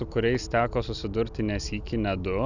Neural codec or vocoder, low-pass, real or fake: none; 7.2 kHz; real